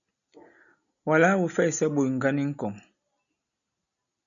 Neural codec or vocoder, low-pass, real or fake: none; 7.2 kHz; real